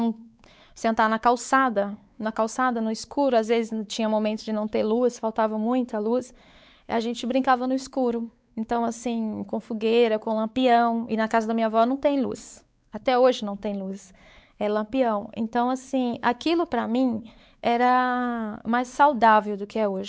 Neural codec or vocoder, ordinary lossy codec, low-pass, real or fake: codec, 16 kHz, 4 kbps, X-Codec, WavLM features, trained on Multilingual LibriSpeech; none; none; fake